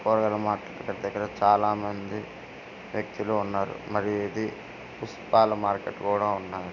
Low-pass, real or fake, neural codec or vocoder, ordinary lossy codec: 7.2 kHz; real; none; none